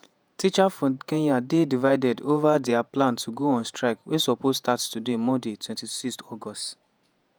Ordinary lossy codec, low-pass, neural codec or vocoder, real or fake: none; none; vocoder, 48 kHz, 128 mel bands, Vocos; fake